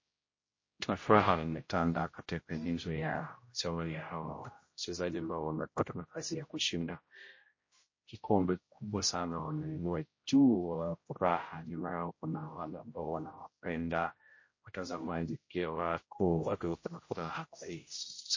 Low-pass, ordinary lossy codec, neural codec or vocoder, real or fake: 7.2 kHz; MP3, 32 kbps; codec, 16 kHz, 0.5 kbps, X-Codec, HuBERT features, trained on general audio; fake